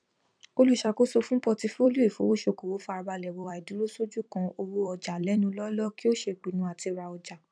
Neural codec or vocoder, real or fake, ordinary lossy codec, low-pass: vocoder, 44.1 kHz, 128 mel bands every 256 samples, BigVGAN v2; fake; none; 9.9 kHz